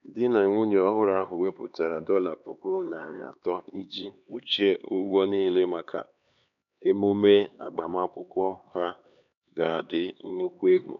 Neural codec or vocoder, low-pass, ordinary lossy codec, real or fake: codec, 16 kHz, 2 kbps, X-Codec, HuBERT features, trained on LibriSpeech; 7.2 kHz; none; fake